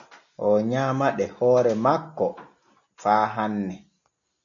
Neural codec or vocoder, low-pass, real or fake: none; 7.2 kHz; real